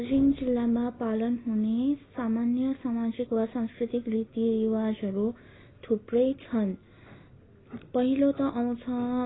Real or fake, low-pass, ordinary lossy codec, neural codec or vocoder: real; 7.2 kHz; AAC, 16 kbps; none